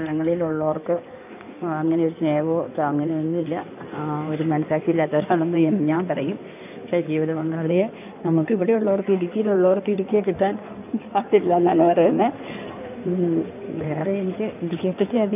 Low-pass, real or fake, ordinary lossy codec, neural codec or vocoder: 3.6 kHz; fake; none; codec, 16 kHz in and 24 kHz out, 2.2 kbps, FireRedTTS-2 codec